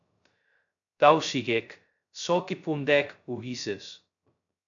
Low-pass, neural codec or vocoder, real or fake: 7.2 kHz; codec, 16 kHz, 0.2 kbps, FocalCodec; fake